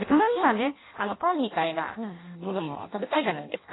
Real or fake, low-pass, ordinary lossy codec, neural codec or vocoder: fake; 7.2 kHz; AAC, 16 kbps; codec, 16 kHz in and 24 kHz out, 0.6 kbps, FireRedTTS-2 codec